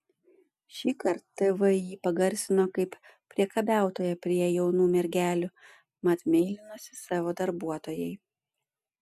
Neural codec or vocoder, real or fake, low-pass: none; real; 14.4 kHz